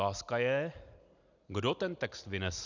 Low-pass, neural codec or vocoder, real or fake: 7.2 kHz; none; real